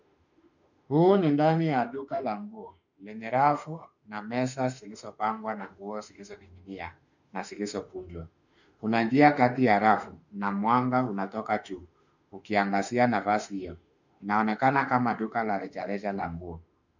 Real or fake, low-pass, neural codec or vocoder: fake; 7.2 kHz; autoencoder, 48 kHz, 32 numbers a frame, DAC-VAE, trained on Japanese speech